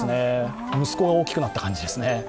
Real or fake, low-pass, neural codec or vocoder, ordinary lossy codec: real; none; none; none